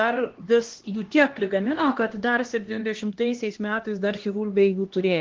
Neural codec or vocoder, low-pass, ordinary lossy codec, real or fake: codec, 16 kHz, 1 kbps, X-Codec, HuBERT features, trained on LibriSpeech; 7.2 kHz; Opus, 16 kbps; fake